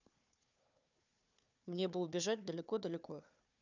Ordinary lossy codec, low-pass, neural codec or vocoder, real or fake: none; 7.2 kHz; codec, 16 kHz, 4 kbps, FunCodec, trained on Chinese and English, 50 frames a second; fake